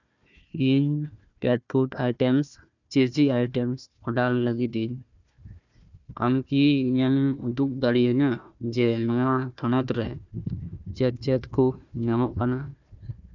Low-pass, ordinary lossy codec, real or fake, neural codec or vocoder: 7.2 kHz; none; fake; codec, 16 kHz, 1 kbps, FunCodec, trained on Chinese and English, 50 frames a second